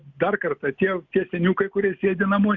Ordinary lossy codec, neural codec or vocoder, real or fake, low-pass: Opus, 64 kbps; none; real; 7.2 kHz